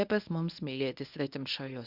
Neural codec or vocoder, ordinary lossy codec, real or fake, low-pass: codec, 24 kHz, 0.9 kbps, WavTokenizer, medium speech release version 1; Opus, 64 kbps; fake; 5.4 kHz